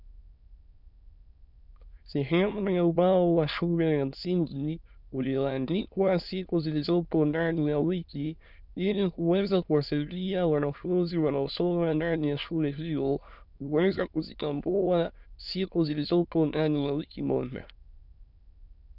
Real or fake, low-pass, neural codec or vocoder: fake; 5.4 kHz; autoencoder, 22.05 kHz, a latent of 192 numbers a frame, VITS, trained on many speakers